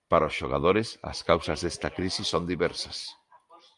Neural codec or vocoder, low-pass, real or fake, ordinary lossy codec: none; 10.8 kHz; real; Opus, 32 kbps